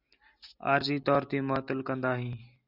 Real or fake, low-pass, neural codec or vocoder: real; 5.4 kHz; none